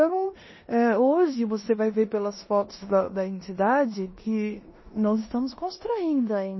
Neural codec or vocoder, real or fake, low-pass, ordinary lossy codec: codec, 16 kHz in and 24 kHz out, 0.9 kbps, LongCat-Audio-Codec, four codebook decoder; fake; 7.2 kHz; MP3, 24 kbps